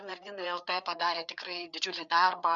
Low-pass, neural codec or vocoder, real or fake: 7.2 kHz; codec, 16 kHz, 4 kbps, FreqCodec, larger model; fake